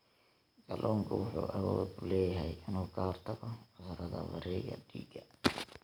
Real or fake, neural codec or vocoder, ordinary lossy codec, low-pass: real; none; none; none